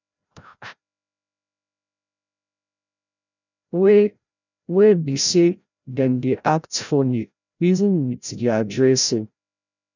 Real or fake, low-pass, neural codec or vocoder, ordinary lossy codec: fake; 7.2 kHz; codec, 16 kHz, 0.5 kbps, FreqCodec, larger model; none